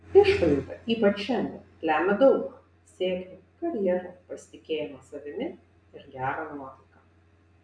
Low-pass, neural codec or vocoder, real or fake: 9.9 kHz; none; real